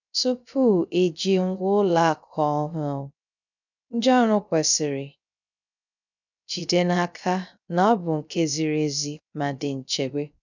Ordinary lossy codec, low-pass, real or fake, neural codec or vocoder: none; 7.2 kHz; fake; codec, 16 kHz, 0.3 kbps, FocalCodec